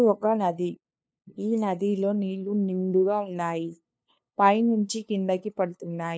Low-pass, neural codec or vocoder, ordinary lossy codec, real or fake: none; codec, 16 kHz, 2 kbps, FunCodec, trained on LibriTTS, 25 frames a second; none; fake